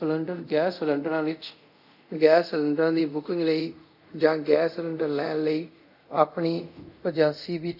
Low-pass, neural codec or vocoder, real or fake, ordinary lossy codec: 5.4 kHz; codec, 24 kHz, 0.5 kbps, DualCodec; fake; none